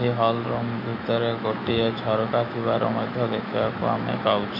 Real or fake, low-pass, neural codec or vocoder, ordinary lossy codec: fake; 5.4 kHz; autoencoder, 48 kHz, 128 numbers a frame, DAC-VAE, trained on Japanese speech; MP3, 32 kbps